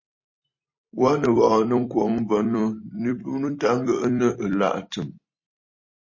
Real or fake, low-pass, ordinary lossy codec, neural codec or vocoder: fake; 7.2 kHz; MP3, 32 kbps; vocoder, 44.1 kHz, 128 mel bands every 256 samples, BigVGAN v2